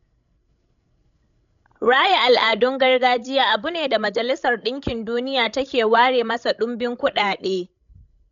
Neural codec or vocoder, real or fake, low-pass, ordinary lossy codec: codec, 16 kHz, 16 kbps, FreqCodec, larger model; fake; 7.2 kHz; none